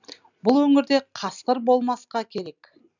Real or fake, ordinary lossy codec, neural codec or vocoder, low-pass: real; none; none; 7.2 kHz